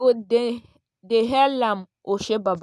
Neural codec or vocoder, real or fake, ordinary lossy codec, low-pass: none; real; none; none